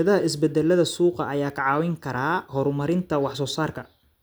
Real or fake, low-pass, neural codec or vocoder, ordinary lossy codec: real; none; none; none